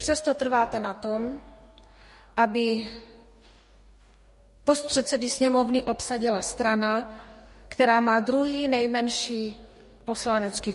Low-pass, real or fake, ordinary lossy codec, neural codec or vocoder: 14.4 kHz; fake; MP3, 48 kbps; codec, 44.1 kHz, 2.6 kbps, DAC